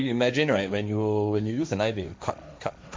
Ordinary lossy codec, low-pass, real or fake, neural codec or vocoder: none; none; fake; codec, 16 kHz, 1.1 kbps, Voila-Tokenizer